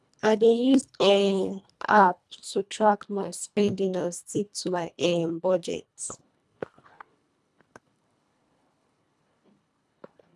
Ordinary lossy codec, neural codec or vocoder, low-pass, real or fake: none; codec, 24 kHz, 1.5 kbps, HILCodec; none; fake